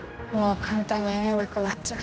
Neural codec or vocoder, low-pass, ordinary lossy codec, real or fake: codec, 16 kHz, 1 kbps, X-Codec, HuBERT features, trained on general audio; none; none; fake